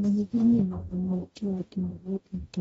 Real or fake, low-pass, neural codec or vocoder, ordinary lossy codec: fake; 19.8 kHz; codec, 44.1 kHz, 0.9 kbps, DAC; AAC, 24 kbps